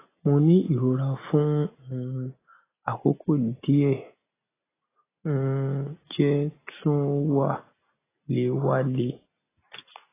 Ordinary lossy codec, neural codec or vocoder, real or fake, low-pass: AAC, 16 kbps; none; real; 3.6 kHz